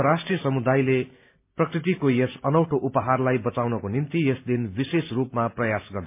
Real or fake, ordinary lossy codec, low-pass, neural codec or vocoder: real; MP3, 32 kbps; 3.6 kHz; none